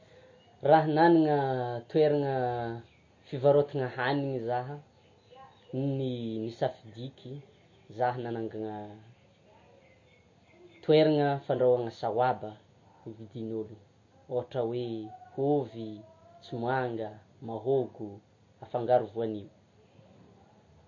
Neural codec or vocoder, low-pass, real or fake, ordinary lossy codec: none; 7.2 kHz; real; MP3, 32 kbps